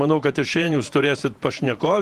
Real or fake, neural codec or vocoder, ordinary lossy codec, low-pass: fake; vocoder, 48 kHz, 128 mel bands, Vocos; Opus, 24 kbps; 14.4 kHz